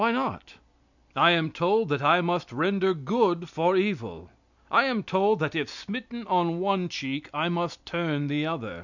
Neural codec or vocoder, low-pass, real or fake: none; 7.2 kHz; real